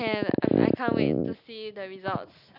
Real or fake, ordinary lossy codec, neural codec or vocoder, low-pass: real; none; none; 5.4 kHz